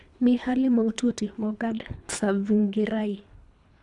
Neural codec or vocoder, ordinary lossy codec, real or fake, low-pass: codec, 24 kHz, 3 kbps, HILCodec; none; fake; 10.8 kHz